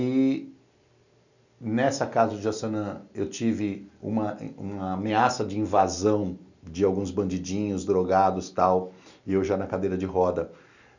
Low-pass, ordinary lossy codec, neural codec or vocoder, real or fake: 7.2 kHz; none; none; real